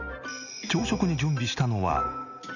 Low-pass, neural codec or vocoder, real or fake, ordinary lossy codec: 7.2 kHz; none; real; none